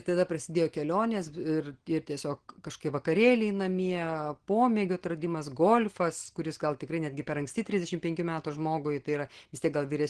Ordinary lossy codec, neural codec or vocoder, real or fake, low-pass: Opus, 16 kbps; none; real; 9.9 kHz